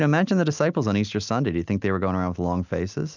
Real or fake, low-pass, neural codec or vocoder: real; 7.2 kHz; none